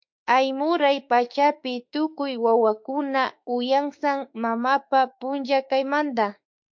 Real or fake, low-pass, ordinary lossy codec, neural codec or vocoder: fake; 7.2 kHz; MP3, 48 kbps; autoencoder, 48 kHz, 32 numbers a frame, DAC-VAE, trained on Japanese speech